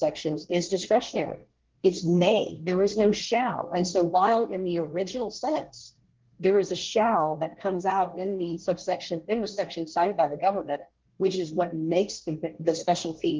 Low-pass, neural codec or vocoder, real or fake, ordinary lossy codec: 7.2 kHz; codec, 24 kHz, 1 kbps, SNAC; fake; Opus, 16 kbps